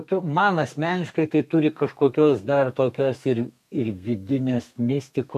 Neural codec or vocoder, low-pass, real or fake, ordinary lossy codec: codec, 32 kHz, 1.9 kbps, SNAC; 14.4 kHz; fake; MP3, 96 kbps